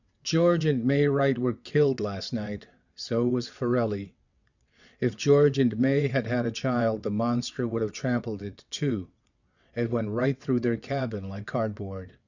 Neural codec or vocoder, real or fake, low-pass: vocoder, 22.05 kHz, 80 mel bands, WaveNeXt; fake; 7.2 kHz